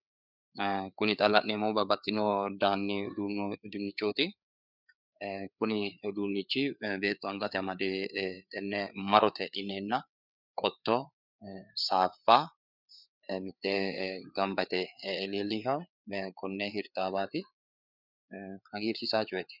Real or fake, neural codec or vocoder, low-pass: fake; codec, 16 kHz, 4 kbps, FreqCodec, larger model; 5.4 kHz